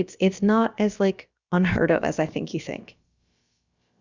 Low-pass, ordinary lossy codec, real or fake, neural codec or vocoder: 7.2 kHz; Opus, 64 kbps; fake; codec, 16 kHz, about 1 kbps, DyCAST, with the encoder's durations